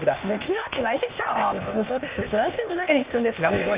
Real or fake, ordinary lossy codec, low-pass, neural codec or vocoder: fake; Opus, 64 kbps; 3.6 kHz; codec, 16 kHz, 0.8 kbps, ZipCodec